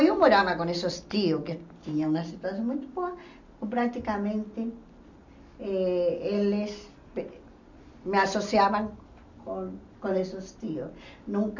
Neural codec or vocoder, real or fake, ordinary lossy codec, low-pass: none; real; none; 7.2 kHz